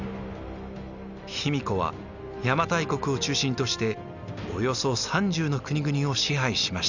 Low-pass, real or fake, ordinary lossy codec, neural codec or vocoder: 7.2 kHz; real; none; none